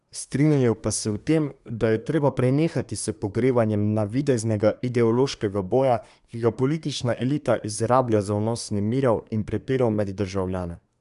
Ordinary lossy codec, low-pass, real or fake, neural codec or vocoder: none; 10.8 kHz; fake; codec, 24 kHz, 1 kbps, SNAC